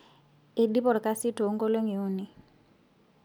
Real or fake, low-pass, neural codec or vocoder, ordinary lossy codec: real; none; none; none